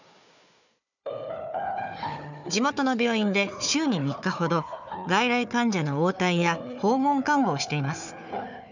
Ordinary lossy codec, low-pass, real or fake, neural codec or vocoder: none; 7.2 kHz; fake; codec, 16 kHz, 4 kbps, FunCodec, trained on Chinese and English, 50 frames a second